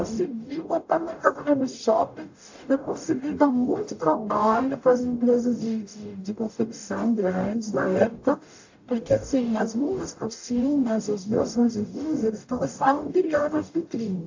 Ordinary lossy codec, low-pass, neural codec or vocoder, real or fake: AAC, 48 kbps; 7.2 kHz; codec, 44.1 kHz, 0.9 kbps, DAC; fake